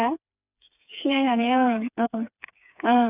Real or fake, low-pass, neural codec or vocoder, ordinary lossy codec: fake; 3.6 kHz; codec, 16 kHz, 4 kbps, FreqCodec, smaller model; none